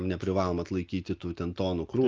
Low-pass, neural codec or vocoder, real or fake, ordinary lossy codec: 7.2 kHz; none; real; Opus, 24 kbps